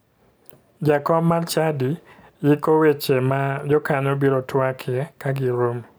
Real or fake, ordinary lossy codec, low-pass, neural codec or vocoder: real; none; none; none